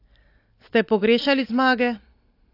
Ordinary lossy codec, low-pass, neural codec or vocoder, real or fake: AAC, 32 kbps; 5.4 kHz; none; real